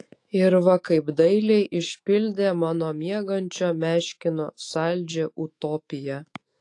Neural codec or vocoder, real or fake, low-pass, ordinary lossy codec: autoencoder, 48 kHz, 128 numbers a frame, DAC-VAE, trained on Japanese speech; fake; 10.8 kHz; AAC, 48 kbps